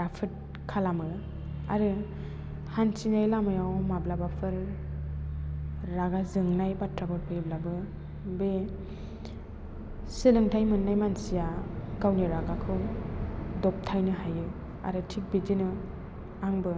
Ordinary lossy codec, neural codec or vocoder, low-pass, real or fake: none; none; none; real